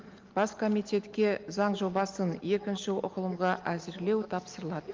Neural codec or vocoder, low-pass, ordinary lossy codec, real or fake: none; 7.2 kHz; Opus, 24 kbps; real